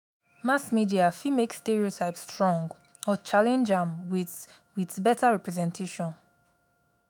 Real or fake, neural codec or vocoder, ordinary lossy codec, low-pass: fake; autoencoder, 48 kHz, 128 numbers a frame, DAC-VAE, trained on Japanese speech; none; none